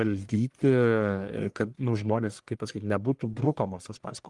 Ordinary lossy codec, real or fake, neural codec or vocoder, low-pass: Opus, 24 kbps; fake; codec, 44.1 kHz, 1.7 kbps, Pupu-Codec; 10.8 kHz